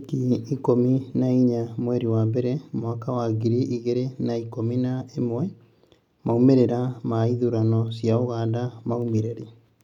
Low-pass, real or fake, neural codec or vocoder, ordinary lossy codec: 19.8 kHz; real; none; none